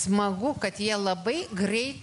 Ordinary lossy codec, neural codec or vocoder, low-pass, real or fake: MP3, 64 kbps; none; 10.8 kHz; real